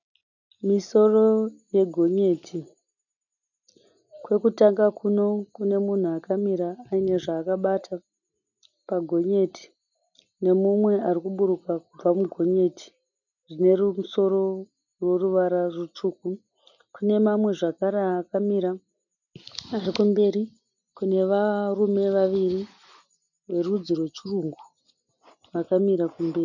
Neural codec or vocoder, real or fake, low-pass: none; real; 7.2 kHz